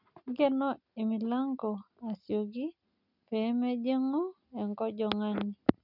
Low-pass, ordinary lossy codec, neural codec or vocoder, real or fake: 5.4 kHz; none; none; real